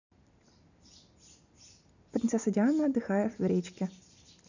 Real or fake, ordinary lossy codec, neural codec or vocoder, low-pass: real; none; none; 7.2 kHz